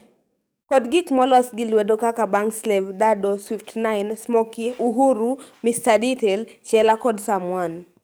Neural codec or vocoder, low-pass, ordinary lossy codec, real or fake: codec, 44.1 kHz, 7.8 kbps, DAC; none; none; fake